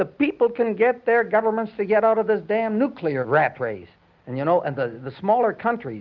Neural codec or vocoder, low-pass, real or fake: none; 7.2 kHz; real